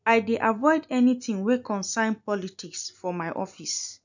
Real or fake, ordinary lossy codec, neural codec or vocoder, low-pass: real; none; none; 7.2 kHz